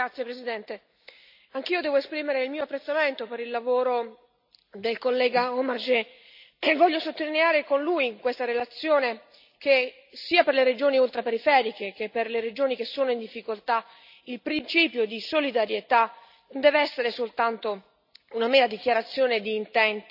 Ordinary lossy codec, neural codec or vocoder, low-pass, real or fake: none; none; 5.4 kHz; real